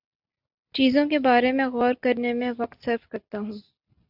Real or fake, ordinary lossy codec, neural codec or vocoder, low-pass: real; AAC, 48 kbps; none; 5.4 kHz